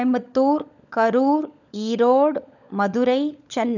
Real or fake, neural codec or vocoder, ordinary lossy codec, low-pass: fake; codec, 16 kHz, 16 kbps, FunCodec, trained on LibriTTS, 50 frames a second; none; 7.2 kHz